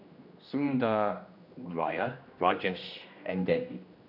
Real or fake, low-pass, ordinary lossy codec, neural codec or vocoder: fake; 5.4 kHz; none; codec, 16 kHz, 1 kbps, X-Codec, HuBERT features, trained on general audio